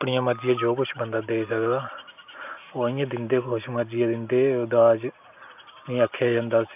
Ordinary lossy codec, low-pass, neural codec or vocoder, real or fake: none; 3.6 kHz; none; real